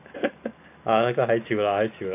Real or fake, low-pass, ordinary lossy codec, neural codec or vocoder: real; 3.6 kHz; AAC, 24 kbps; none